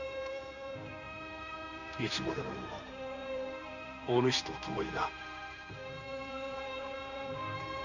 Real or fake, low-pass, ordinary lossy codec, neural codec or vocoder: fake; 7.2 kHz; none; codec, 16 kHz in and 24 kHz out, 1 kbps, XY-Tokenizer